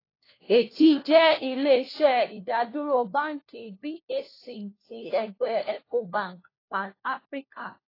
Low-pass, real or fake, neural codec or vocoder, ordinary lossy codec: 5.4 kHz; fake; codec, 16 kHz, 1 kbps, FunCodec, trained on LibriTTS, 50 frames a second; AAC, 24 kbps